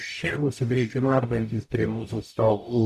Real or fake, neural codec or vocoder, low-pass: fake; codec, 44.1 kHz, 0.9 kbps, DAC; 14.4 kHz